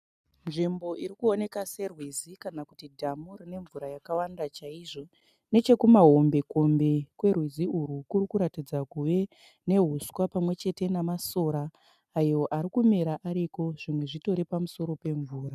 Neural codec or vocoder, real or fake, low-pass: none; real; 14.4 kHz